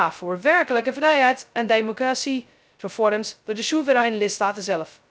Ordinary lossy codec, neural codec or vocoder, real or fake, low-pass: none; codec, 16 kHz, 0.2 kbps, FocalCodec; fake; none